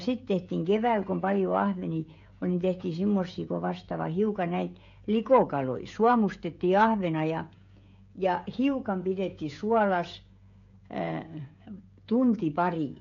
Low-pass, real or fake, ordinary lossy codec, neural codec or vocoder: 7.2 kHz; fake; AAC, 48 kbps; codec, 16 kHz, 16 kbps, FreqCodec, smaller model